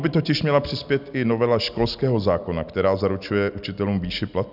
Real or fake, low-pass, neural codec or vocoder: real; 5.4 kHz; none